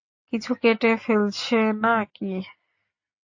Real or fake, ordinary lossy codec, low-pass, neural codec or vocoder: real; AAC, 48 kbps; 7.2 kHz; none